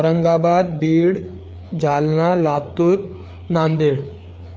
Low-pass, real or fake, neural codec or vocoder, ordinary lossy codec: none; fake; codec, 16 kHz, 4 kbps, FreqCodec, larger model; none